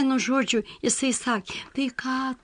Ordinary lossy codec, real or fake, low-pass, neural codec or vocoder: MP3, 64 kbps; real; 9.9 kHz; none